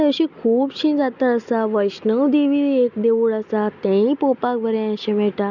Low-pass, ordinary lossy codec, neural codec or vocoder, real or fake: 7.2 kHz; none; none; real